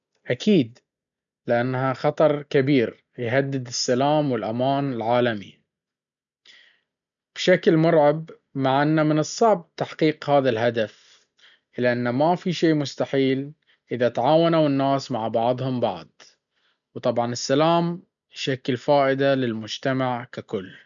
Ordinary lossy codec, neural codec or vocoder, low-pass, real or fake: none; none; 7.2 kHz; real